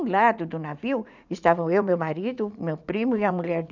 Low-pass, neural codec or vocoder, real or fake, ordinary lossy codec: 7.2 kHz; vocoder, 44.1 kHz, 80 mel bands, Vocos; fake; none